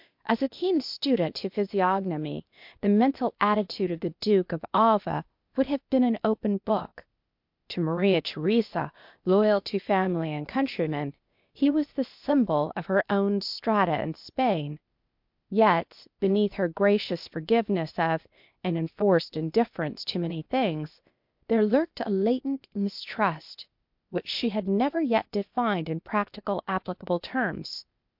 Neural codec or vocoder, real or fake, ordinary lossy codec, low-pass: codec, 16 kHz, 0.8 kbps, ZipCodec; fake; MP3, 48 kbps; 5.4 kHz